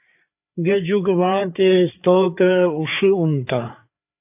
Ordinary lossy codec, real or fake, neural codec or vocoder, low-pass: AAC, 32 kbps; fake; codec, 16 kHz, 4 kbps, FreqCodec, larger model; 3.6 kHz